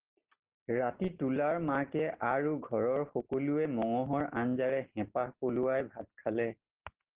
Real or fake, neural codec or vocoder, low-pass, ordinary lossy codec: real; none; 3.6 kHz; Opus, 16 kbps